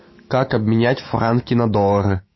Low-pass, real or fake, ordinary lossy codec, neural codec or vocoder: 7.2 kHz; fake; MP3, 24 kbps; autoencoder, 48 kHz, 128 numbers a frame, DAC-VAE, trained on Japanese speech